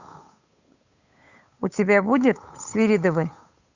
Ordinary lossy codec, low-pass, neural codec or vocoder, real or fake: Opus, 64 kbps; 7.2 kHz; codec, 16 kHz, 8 kbps, FunCodec, trained on Chinese and English, 25 frames a second; fake